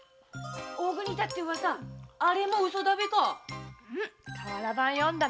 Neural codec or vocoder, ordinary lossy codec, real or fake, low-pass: none; none; real; none